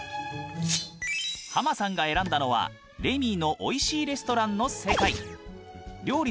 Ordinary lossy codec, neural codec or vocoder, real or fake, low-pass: none; none; real; none